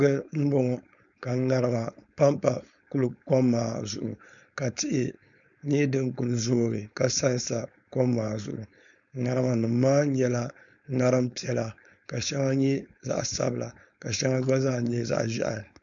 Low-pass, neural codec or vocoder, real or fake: 7.2 kHz; codec, 16 kHz, 4.8 kbps, FACodec; fake